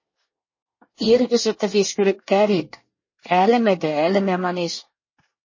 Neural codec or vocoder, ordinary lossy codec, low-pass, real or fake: codec, 24 kHz, 1 kbps, SNAC; MP3, 32 kbps; 7.2 kHz; fake